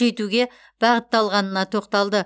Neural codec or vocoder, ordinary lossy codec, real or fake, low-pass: none; none; real; none